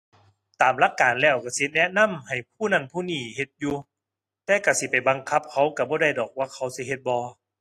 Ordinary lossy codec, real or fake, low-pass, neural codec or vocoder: AAC, 48 kbps; real; 14.4 kHz; none